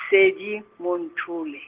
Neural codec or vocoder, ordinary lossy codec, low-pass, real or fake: none; Opus, 16 kbps; 3.6 kHz; real